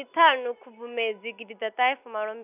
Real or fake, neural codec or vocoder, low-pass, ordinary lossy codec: real; none; 3.6 kHz; none